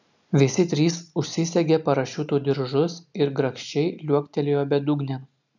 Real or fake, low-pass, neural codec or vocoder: real; 7.2 kHz; none